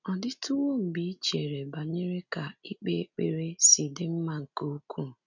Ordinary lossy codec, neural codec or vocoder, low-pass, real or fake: none; none; 7.2 kHz; real